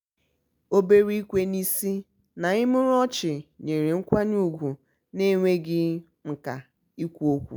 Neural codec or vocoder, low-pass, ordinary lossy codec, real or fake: none; none; none; real